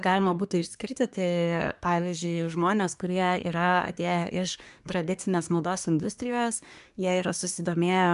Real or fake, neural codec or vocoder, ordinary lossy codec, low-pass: fake; codec, 24 kHz, 1 kbps, SNAC; MP3, 96 kbps; 10.8 kHz